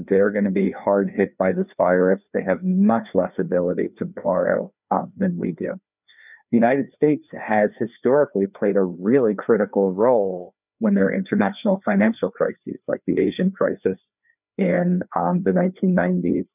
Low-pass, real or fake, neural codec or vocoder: 3.6 kHz; fake; codec, 16 kHz, 2 kbps, FreqCodec, larger model